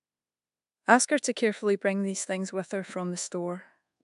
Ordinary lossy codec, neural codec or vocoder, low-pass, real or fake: AAC, 96 kbps; codec, 24 kHz, 1.2 kbps, DualCodec; 10.8 kHz; fake